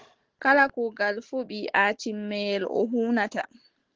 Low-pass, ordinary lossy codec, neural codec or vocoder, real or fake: 7.2 kHz; Opus, 16 kbps; none; real